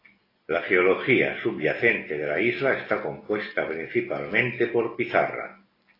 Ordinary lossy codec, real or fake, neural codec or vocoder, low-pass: AAC, 24 kbps; real; none; 5.4 kHz